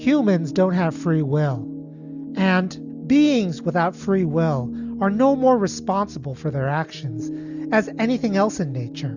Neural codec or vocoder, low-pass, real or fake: none; 7.2 kHz; real